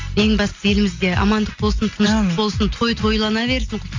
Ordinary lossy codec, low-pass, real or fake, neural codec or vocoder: AAC, 48 kbps; 7.2 kHz; real; none